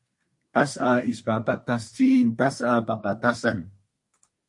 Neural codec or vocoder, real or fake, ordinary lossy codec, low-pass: codec, 24 kHz, 1 kbps, SNAC; fake; MP3, 48 kbps; 10.8 kHz